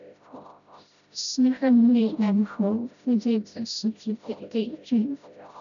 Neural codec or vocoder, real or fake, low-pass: codec, 16 kHz, 0.5 kbps, FreqCodec, smaller model; fake; 7.2 kHz